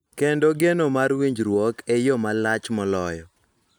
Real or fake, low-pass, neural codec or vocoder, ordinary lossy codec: real; none; none; none